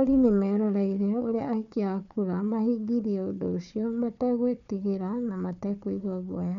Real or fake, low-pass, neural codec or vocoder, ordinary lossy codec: fake; 7.2 kHz; codec, 16 kHz, 4 kbps, FunCodec, trained on Chinese and English, 50 frames a second; none